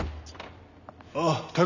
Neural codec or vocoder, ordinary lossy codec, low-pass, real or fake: none; none; 7.2 kHz; real